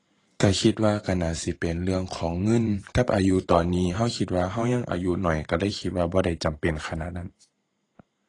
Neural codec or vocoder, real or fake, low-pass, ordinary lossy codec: vocoder, 44.1 kHz, 128 mel bands every 512 samples, BigVGAN v2; fake; 10.8 kHz; AAC, 32 kbps